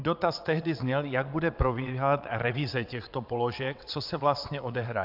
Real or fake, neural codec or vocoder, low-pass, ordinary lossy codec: fake; vocoder, 22.05 kHz, 80 mel bands, WaveNeXt; 5.4 kHz; AAC, 48 kbps